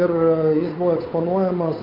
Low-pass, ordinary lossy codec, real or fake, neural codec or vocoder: 5.4 kHz; MP3, 48 kbps; fake; codec, 16 kHz, 8 kbps, FunCodec, trained on Chinese and English, 25 frames a second